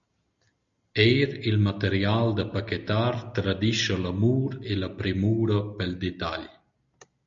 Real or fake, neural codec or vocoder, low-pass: real; none; 7.2 kHz